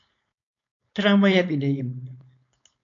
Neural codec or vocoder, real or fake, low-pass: codec, 16 kHz, 4.8 kbps, FACodec; fake; 7.2 kHz